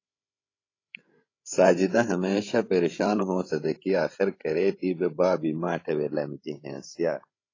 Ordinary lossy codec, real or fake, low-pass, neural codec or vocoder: AAC, 32 kbps; fake; 7.2 kHz; codec, 16 kHz, 16 kbps, FreqCodec, larger model